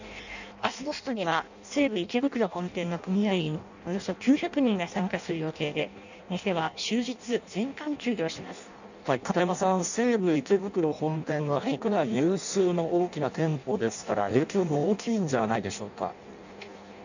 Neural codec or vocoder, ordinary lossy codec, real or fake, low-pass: codec, 16 kHz in and 24 kHz out, 0.6 kbps, FireRedTTS-2 codec; none; fake; 7.2 kHz